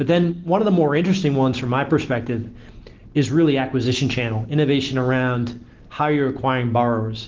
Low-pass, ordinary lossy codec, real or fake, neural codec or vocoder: 7.2 kHz; Opus, 16 kbps; real; none